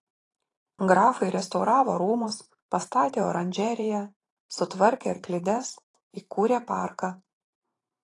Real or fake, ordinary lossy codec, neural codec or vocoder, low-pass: fake; AAC, 32 kbps; vocoder, 44.1 kHz, 128 mel bands every 512 samples, BigVGAN v2; 10.8 kHz